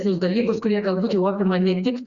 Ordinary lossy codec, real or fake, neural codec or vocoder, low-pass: Opus, 64 kbps; fake; codec, 16 kHz, 2 kbps, FreqCodec, smaller model; 7.2 kHz